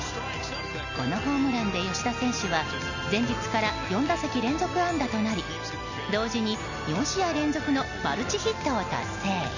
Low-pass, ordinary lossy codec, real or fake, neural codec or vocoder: 7.2 kHz; none; real; none